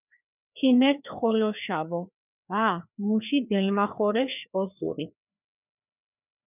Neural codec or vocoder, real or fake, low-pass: codec, 16 kHz, 2 kbps, FreqCodec, larger model; fake; 3.6 kHz